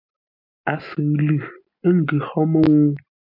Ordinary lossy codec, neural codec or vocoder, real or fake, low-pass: MP3, 48 kbps; none; real; 5.4 kHz